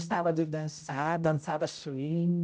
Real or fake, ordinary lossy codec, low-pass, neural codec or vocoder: fake; none; none; codec, 16 kHz, 0.5 kbps, X-Codec, HuBERT features, trained on general audio